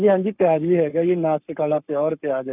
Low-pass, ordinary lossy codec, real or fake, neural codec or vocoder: 3.6 kHz; none; fake; codec, 44.1 kHz, 7.8 kbps, Pupu-Codec